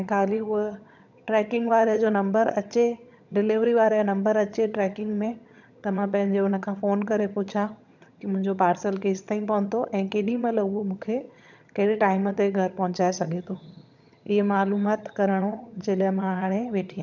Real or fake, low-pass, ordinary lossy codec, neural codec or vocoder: fake; 7.2 kHz; none; vocoder, 22.05 kHz, 80 mel bands, HiFi-GAN